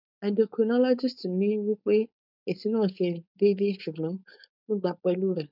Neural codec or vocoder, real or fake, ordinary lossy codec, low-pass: codec, 16 kHz, 4.8 kbps, FACodec; fake; none; 5.4 kHz